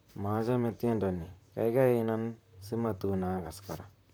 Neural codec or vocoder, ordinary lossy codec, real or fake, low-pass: vocoder, 44.1 kHz, 128 mel bands, Pupu-Vocoder; none; fake; none